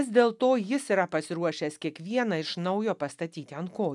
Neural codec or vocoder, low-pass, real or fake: none; 10.8 kHz; real